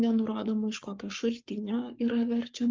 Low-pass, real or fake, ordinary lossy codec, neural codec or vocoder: 7.2 kHz; fake; Opus, 24 kbps; codec, 44.1 kHz, 7.8 kbps, Pupu-Codec